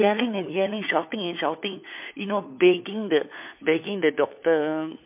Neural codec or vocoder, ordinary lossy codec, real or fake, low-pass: codec, 16 kHz, 4 kbps, FunCodec, trained on LibriTTS, 50 frames a second; MP3, 32 kbps; fake; 3.6 kHz